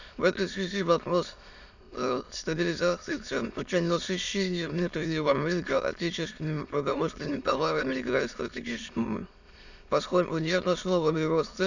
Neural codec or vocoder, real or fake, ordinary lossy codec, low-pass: autoencoder, 22.05 kHz, a latent of 192 numbers a frame, VITS, trained on many speakers; fake; none; 7.2 kHz